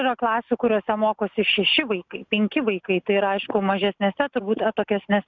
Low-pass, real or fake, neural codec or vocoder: 7.2 kHz; real; none